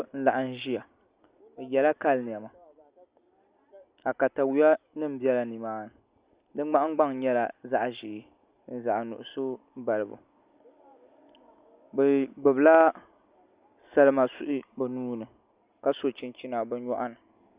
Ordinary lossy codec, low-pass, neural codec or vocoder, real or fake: Opus, 64 kbps; 3.6 kHz; none; real